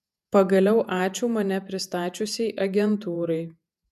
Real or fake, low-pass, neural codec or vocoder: fake; 14.4 kHz; vocoder, 48 kHz, 128 mel bands, Vocos